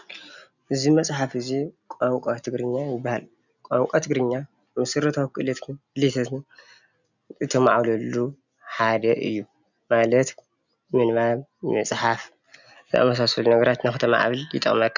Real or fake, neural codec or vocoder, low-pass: real; none; 7.2 kHz